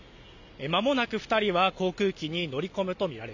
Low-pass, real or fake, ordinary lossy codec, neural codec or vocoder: 7.2 kHz; real; none; none